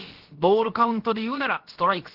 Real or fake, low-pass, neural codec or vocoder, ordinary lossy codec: fake; 5.4 kHz; codec, 16 kHz, about 1 kbps, DyCAST, with the encoder's durations; Opus, 16 kbps